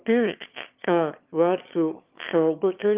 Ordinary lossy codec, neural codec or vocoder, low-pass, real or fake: Opus, 32 kbps; autoencoder, 22.05 kHz, a latent of 192 numbers a frame, VITS, trained on one speaker; 3.6 kHz; fake